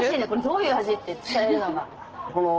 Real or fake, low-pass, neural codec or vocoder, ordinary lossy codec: real; 7.2 kHz; none; Opus, 16 kbps